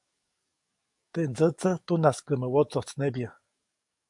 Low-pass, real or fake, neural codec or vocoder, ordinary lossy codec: 10.8 kHz; fake; codec, 44.1 kHz, 7.8 kbps, DAC; MP3, 64 kbps